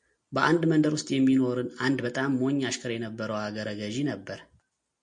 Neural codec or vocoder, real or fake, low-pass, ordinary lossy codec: none; real; 9.9 kHz; MP3, 48 kbps